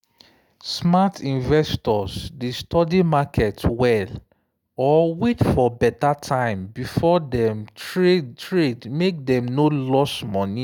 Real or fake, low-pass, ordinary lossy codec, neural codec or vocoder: real; 19.8 kHz; none; none